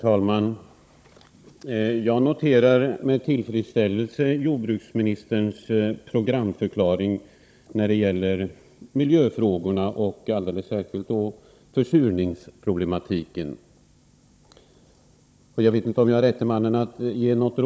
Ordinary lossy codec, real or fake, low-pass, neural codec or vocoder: none; fake; none; codec, 16 kHz, 16 kbps, FunCodec, trained on Chinese and English, 50 frames a second